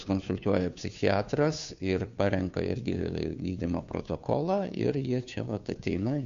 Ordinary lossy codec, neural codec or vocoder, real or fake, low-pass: Opus, 64 kbps; codec, 16 kHz, 2 kbps, FunCodec, trained on Chinese and English, 25 frames a second; fake; 7.2 kHz